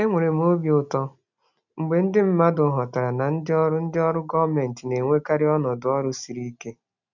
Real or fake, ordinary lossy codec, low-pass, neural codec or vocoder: real; none; 7.2 kHz; none